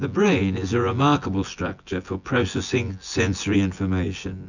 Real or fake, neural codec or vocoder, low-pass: fake; vocoder, 24 kHz, 100 mel bands, Vocos; 7.2 kHz